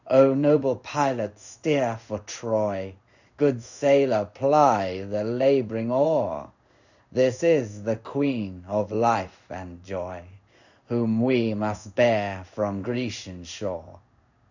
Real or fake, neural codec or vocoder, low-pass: fake; codec, 16 kHz in and 24 kHz out, 1 kbps, XY-Tokenizer; 7.2 kHz